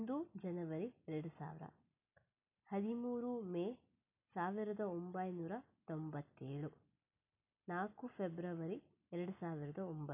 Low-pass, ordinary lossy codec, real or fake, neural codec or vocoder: 3.6 kHz; none; real; none